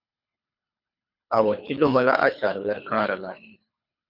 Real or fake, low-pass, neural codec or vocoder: fake; 5.4 kHz; codec, 24 kHz, 3 kbps, HILCodec